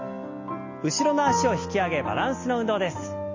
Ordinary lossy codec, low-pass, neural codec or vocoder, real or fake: MP3, 32 kbps; 7.2 kHz; none; real